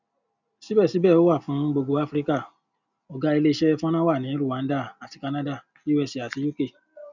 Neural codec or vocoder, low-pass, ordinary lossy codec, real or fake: none; 7.2 kHz; none; real